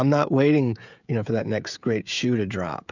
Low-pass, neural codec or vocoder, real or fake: 7.2 kHz; codec, 16 kHz, 16 kbps, FreqCodec, smaller model; fake